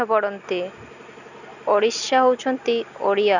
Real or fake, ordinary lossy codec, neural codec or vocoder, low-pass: real; none; none; 7.2 kHz